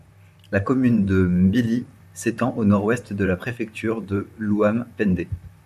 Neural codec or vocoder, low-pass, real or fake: vocoder, 44.1 kHz, 128 mel bands, Pupu-Vocoder; 14.4 kHz; fake